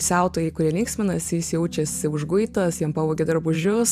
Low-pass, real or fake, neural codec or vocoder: 14.4 kHz; fake; vocoder, 44.1 kHz, 128 mel bands every 512 samples, BigVGAN v2